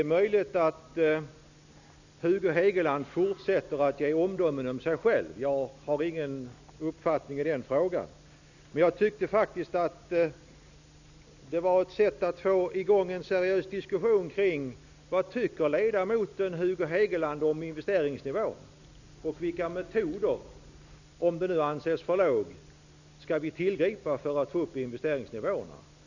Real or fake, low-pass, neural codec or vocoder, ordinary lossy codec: real; 7.2 kHz; none; none